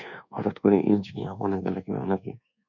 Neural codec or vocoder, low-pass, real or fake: codec, 24 kHz, 1.2 kbps, DualCodec; 7.2 kHz; fake